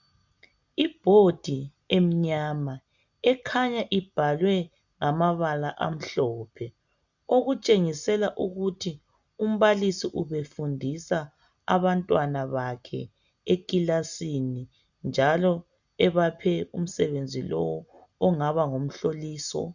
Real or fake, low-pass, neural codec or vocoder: real; 7.2 kHz; none